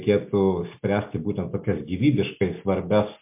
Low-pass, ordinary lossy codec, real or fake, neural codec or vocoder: 3.6 kHz; MP3, 32 kbps; real; none